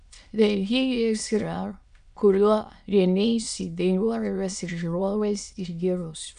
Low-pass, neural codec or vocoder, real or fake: 9.9 kHz; autoencoder, 22.05 kHz, a latent of 192 numbers a frame, VITS, trained on many speakers; fake